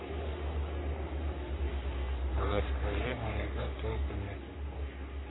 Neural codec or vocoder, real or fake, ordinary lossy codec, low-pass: codec, 44.1 kHz, 3.4 kbps, Pupu-Codec; fake; AAC, 16 kbps; 7.2 kHz